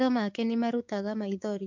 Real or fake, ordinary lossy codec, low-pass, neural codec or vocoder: fake; MP3, 48 kbps; 7.2 kHz; codec, 16 kHz, 6 kbps, DAC